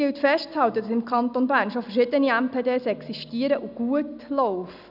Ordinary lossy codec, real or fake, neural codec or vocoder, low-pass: none; real; none; 5.4 kHz